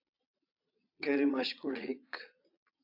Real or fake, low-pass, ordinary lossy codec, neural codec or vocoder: fake; 5.4 kHz; AAC, 48 kbps; vocoder, 22.05 kHz, 80 mel bands, Vocos